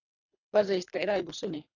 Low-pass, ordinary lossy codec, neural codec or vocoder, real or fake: 7.2 kHz; AAC, 32 kbps; codec, 24 kHz, 3 kbps, HILCodec; fake